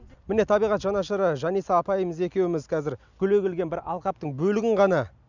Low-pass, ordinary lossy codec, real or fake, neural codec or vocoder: 7.2 kHz; none; real; none